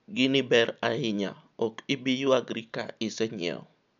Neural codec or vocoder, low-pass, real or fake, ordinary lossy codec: none; 7.2 kHz; real; none